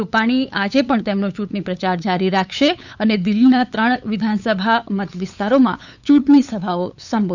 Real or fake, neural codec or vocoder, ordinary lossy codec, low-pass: fake; codec, 16 kHz, 8 kbps, FunCodec, trained on LibriTTS, 25 frames a second; none; 7.2 kHz